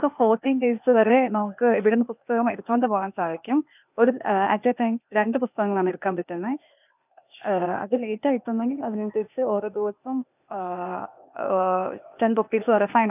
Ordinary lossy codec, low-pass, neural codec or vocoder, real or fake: none; 3.6 kHz; codec, 16 kHz, 0.8 kbps, ZipCodec; fake